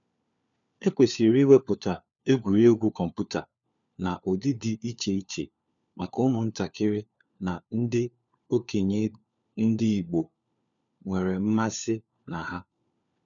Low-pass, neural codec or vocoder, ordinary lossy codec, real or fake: 7.2 kHz; codec, 16 kHz, 4 kbps, FunCodec, trained on LibriTTS, 50 frames a second; none; fake